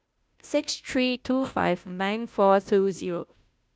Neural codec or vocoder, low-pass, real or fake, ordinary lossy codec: codec, 16 kHz, 0.5 kbps, FunCodec, trained on Chinese and English, 25 frames a second; none; fake; none